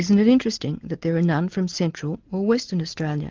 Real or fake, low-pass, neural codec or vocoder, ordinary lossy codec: real; 7.2 kHz; none; Opus, 16 kbps